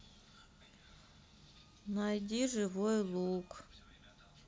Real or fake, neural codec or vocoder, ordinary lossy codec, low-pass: real; none; none; none